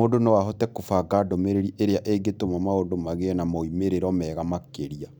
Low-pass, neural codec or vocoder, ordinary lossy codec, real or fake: none; none; none; real